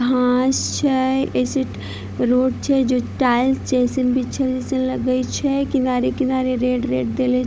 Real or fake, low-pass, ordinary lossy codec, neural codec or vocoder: fake; none; none; codec, 16 kHz, 16 kbps, FunCodec, trained on Chinese and English, 50 frames a second